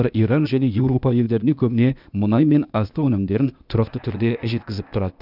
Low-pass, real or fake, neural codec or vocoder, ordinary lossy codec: 5.4 kHz; fake; codec, 16 kHz, 0.8 kbps, ZipCodec; none